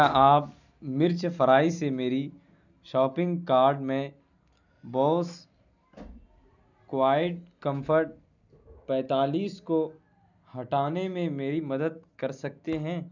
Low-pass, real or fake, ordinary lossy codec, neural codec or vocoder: 7.2 kHz; real; none; none